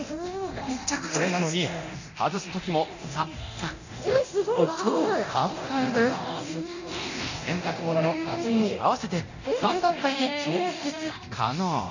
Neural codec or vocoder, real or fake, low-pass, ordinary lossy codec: codec, 24 kHz, 0.9 kbps, DualCodec; fake; 7.2 kHz; none